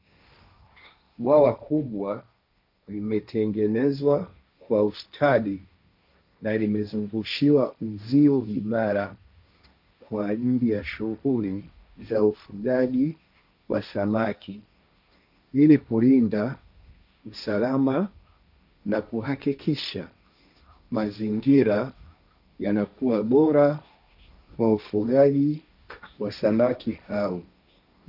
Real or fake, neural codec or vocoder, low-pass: fake; codec, 16 kHz, 1.1 kbps, Voila-Tokenizer; 5.4 kHz